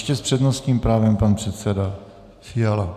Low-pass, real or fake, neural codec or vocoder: 14.4 kHz; real; none